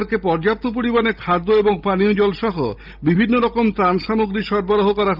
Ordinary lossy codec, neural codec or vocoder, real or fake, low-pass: Opus, 24 kbps; none; real; 5.4 kHz